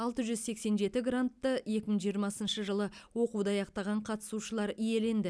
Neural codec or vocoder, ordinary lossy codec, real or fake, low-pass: none; none; real; none